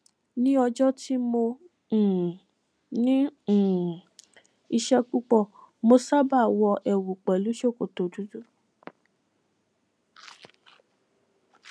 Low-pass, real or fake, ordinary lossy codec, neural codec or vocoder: none; real; none; none